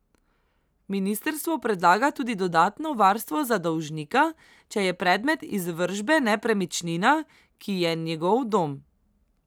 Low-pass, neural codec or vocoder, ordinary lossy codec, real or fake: none; none; none; real